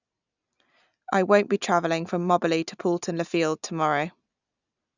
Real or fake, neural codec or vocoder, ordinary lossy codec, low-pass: real; none; none; 7.2 kHz